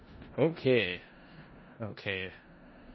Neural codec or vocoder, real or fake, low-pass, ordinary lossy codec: codec, 16 kHz in and 24 kHz out, 0.4 kbps, LongCat-Audio-Codec, four codebook decoder; fake; 7.2 kHz; MP3, 24 kbps